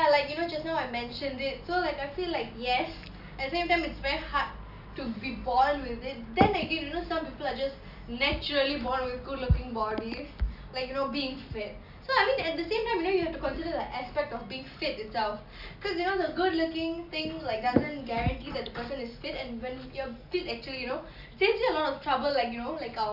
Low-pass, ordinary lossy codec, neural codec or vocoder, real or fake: 5.4 kHz; none; none; real